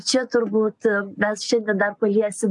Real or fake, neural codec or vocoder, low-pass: real; none; 10.8 kHz